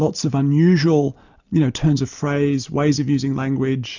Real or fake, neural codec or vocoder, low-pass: real; none; 7.2 kHz